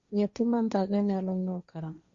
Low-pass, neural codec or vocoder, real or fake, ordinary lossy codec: 7.2 kHz; codec, 16 kHz, 1.1 kbps, Voila-Tokenizer; fake; Opus, 64 kbps